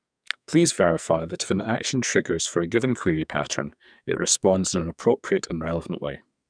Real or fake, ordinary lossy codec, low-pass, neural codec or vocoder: fake; none; 9.9 kHz; codec, 44.1 kHz, 2.6 kbps, SNAC